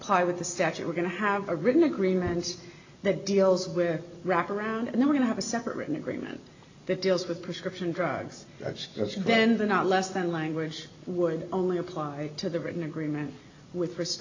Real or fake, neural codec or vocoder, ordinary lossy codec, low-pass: real; none; AAC, 48 kbps; 7.2 kHz